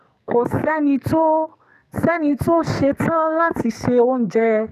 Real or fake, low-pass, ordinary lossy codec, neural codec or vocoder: fake; 14.4 kHz; none; codec, 44.1 kHz, 2.6 kbps, SNAC